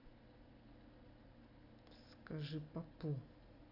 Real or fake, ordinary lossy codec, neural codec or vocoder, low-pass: real; none; none; 5.4 kHz